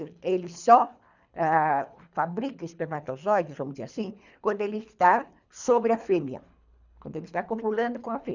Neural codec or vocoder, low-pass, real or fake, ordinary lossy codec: codec, 24 kHz, 3 kbps, HILCodec; 7.2 kHz; fake; none